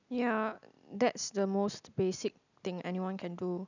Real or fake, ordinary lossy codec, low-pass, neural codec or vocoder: real; none; 7.2 kHz; none